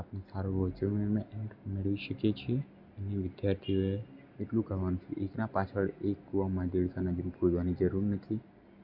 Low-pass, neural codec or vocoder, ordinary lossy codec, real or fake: 5.4 kHz; none; none; real